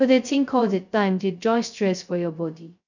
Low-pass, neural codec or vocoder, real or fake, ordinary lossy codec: 7.2 kHz; codec, 16 kHz, 0.2 kbps, FocalCodec; fake; none